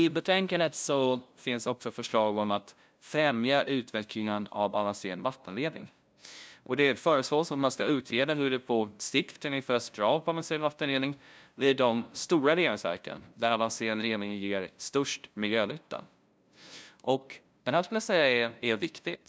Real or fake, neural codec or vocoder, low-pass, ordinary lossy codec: fake; codec, 16 kHz, 0.5 kbps, FunCodec, trained on LibriTTS, 25 frames a second; none; none